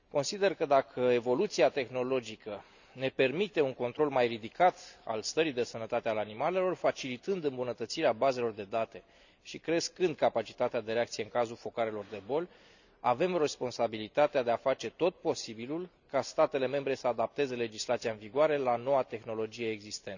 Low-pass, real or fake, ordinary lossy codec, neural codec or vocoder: 7.2 kHz; real; none; none